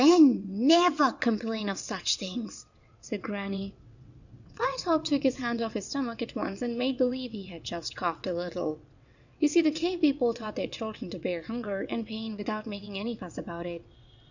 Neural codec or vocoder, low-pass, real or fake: vocoder, 22.05 kHz, 80 mel bands, WaveNeXt; 7.2 kHz; fake